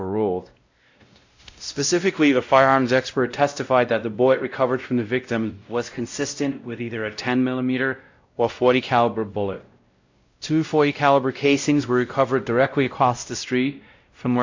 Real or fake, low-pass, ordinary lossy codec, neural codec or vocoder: fake; 7.2 kHz; AAC, 48 kbps; codec, 16 kHz, 0.5 kbps, X-Codec, WavLM features, trained on Multilingual LibriSpeech